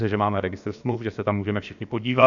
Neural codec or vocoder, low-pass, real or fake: codec, 16 kHz, about 1 kbps, DyCAST, with the encoder's durations; 7.2 kHz; fake